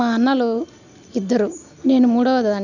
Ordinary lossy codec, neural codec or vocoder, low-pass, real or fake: none; none; 7.2 kHz; real